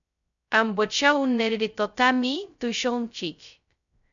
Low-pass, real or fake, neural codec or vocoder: 7.2 kHz; fake; codec, 16 kHz, 0.2 kbps, FocalCodec